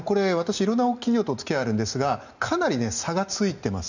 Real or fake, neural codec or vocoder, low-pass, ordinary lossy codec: real; none; 7.2 kHz; none